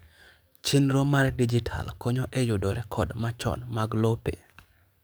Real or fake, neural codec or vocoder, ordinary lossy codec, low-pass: fake; codec, 44.1 kHz, 7.8 kbps, DAC; none; none